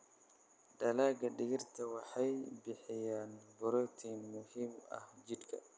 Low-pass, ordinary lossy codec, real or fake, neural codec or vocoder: none; none; real; none